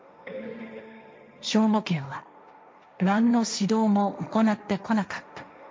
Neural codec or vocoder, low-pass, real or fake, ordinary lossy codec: codec, 16 kHz, 1.1 kbps, Voila-Tokenizer; none; fake; none